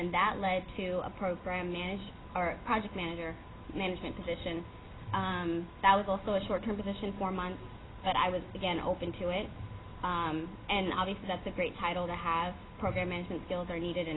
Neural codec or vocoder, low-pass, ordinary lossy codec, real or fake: none; 7.2 kHz; AAC, 16 kbps; real